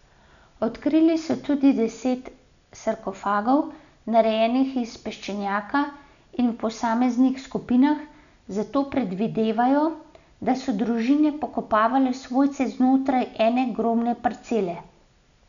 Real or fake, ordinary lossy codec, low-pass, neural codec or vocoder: real; none; 7.2 kHz; none